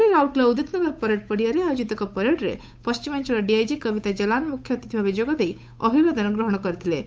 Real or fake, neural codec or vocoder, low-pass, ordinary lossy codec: fake; codec, 16 kHz, 8 kbps, FunCodec, trained on Chinese and English, 25 frames a second; none; none